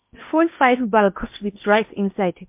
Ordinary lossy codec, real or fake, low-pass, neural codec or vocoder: MP3, 32 kbps; fake; 3.6 kHz; codec, 16 kHz in and 24 kHz out, 0.8 kbps, FocalCodec, streaming, 65536 codes